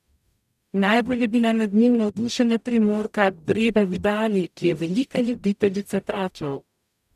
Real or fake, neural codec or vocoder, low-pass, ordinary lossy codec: fake; codec, 44.1 kHz, 0.9 kbps, DAC; 14.4 kHz; none